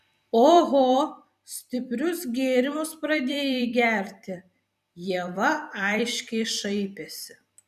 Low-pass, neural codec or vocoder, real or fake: 14.4 kHz; vocoder, 44.1 kHz, 128 mel bands every 256 samples, BigVGAN v2; fake